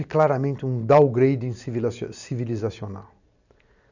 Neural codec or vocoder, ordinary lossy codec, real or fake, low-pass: none; none; real; 7.2 kHz